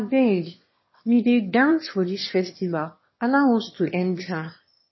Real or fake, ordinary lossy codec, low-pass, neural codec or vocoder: fake; MP3, 24 kbps; 7.2 kHz; autoencoder, 22.05 kHz, a latent of 192 numbers a frame, VITS, trained on one speaker